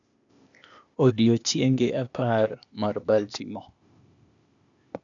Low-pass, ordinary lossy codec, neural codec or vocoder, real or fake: 7.2 kHz; none; codec, 16 kHz, 0.8 kbps, ZipCodec; fake